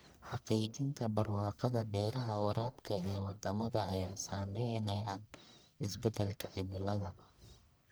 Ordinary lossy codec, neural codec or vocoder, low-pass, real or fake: none; codec, 44.1 kHz, 1.7 kbps, Pupu-Codec; none; fake